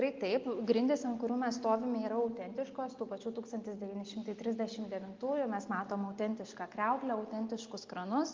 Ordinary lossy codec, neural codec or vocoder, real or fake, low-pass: Opus, 24 kbps; none; real; 7.2 kHz